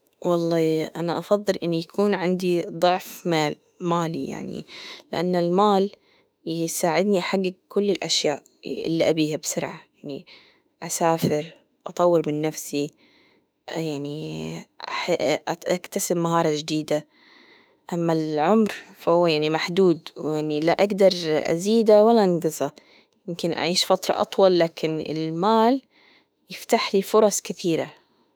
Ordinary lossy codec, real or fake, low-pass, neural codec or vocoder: none; fake; none; autoencoder, 48 kHz, 32 numbers a frame, DAC-VAE, trained on Japanese speech